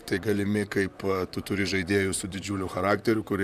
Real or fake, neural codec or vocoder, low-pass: fake; vocoder, 44.1 kHz, 128 mel bands, Pupu-Vocoder; 14.4 kHz